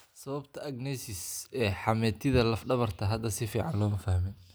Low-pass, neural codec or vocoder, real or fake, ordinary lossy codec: none; none; real; none